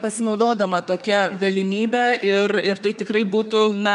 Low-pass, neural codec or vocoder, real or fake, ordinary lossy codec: 10.8 kHz; codec, 24 kHz, 1 kbps, SNAC; fake; MP3, 96 kbps